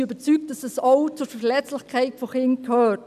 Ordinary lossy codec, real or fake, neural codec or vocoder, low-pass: none; real; none; 14.4 kHz